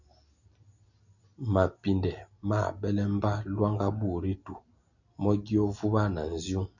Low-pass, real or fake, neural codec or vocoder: 7.2 kHz; real; none